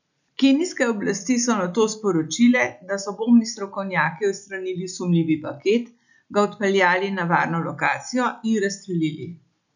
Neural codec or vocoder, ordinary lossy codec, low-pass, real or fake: none; none; 7.2 kHz; real